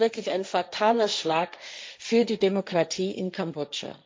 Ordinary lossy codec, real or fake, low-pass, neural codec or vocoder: none; fake; none; codec, 16 kHz, 1.1 kbps, Voila-Tokenizer